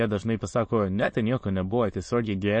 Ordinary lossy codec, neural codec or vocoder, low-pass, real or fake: MP3, 32 kbps; autoencoder, 22.05 kHz, a latent of 192 numbers a frame, VITS, trained on many speakers; 9.9 kHz; fake